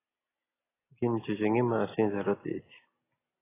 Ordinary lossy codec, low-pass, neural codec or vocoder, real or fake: AAC, 16 kbps; 3.6 kHz; none; real